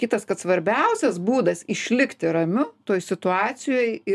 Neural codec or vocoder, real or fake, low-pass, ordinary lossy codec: none; real; 14.4 kHz; MP3, 96 kbps